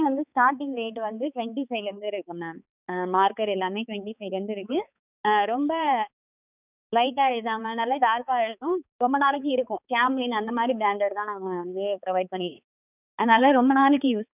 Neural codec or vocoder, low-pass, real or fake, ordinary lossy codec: codec, 16 kHz, 4 kbps, X-Codec, HuBERT features, trained on balanced general audio; 3.6 kHz; fake; none